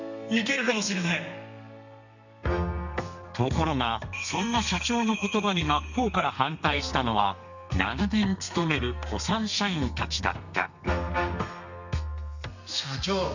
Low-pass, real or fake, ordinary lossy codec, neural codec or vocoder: 7.2 kHz; fake; none; codec, 32 kHz, 1.9 kbps, SNAC